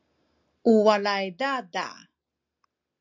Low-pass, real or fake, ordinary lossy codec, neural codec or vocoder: 7.2 kHz; real; MP3, 64 kbps; none